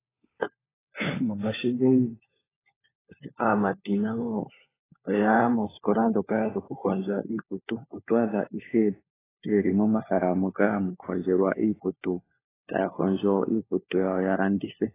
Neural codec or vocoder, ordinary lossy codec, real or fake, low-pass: codec, 16 kHz, 4 kbps, FunCodec, trained on LibriTTS, 50 frames a second; AAC, 16 kbps; fake; 3.6 kHz